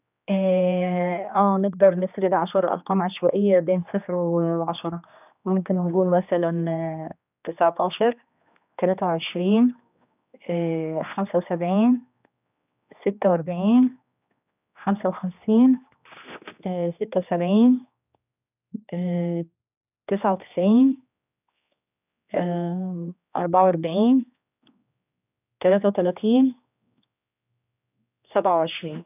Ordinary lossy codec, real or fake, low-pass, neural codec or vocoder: none; fake; 3.6 kHz; codec, 16 kHz, 2 kbps, X-Codec, HuBERT features, trained on general audio